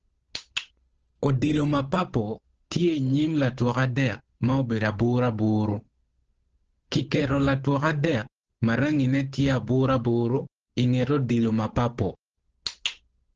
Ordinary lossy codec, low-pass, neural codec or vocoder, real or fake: Opus, 16 kbps; 7.2 kHz; codec, 16 kHz, 8 kbps, FunCodec, trained on Chinese and English, 25 frames a second; fake